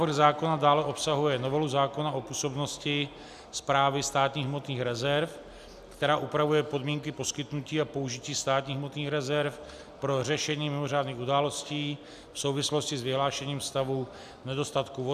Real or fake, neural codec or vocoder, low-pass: real; none; 14.4 kHz